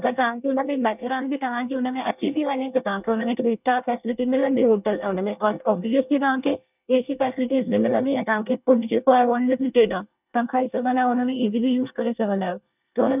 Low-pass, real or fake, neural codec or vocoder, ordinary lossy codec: 3.6 kHz; fake; codec, 24 kHz, 1 kbps, SNAC; none